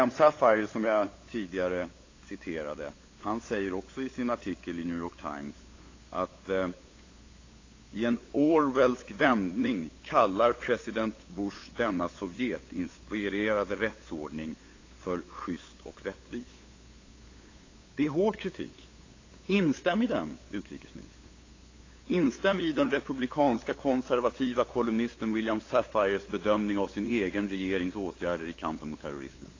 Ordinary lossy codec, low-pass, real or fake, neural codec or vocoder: AAC, 32 kbps; 7.2 kHz; fake; codec, 16 kHz, 8 kbps, FunCodec, trained on LibriTTS, 25 frames a second